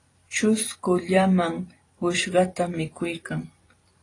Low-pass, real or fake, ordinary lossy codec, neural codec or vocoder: 10.8 kHz; fake; AAC, 32 kbps; vocoder, 44.1 kHz, 128 mel bands every 256 samples, BigVGAN v2